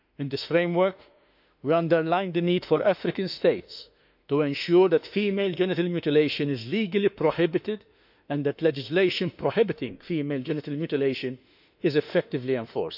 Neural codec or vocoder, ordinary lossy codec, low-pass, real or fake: autoencoder, 48 kHz, 32 numbers a frame, DAC-VAE, trained on Japanese speech; none; 5.4 kHz; fake